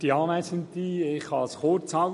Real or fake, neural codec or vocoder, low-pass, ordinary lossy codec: real; none; 14.4 kHz; MP3, 48 kbps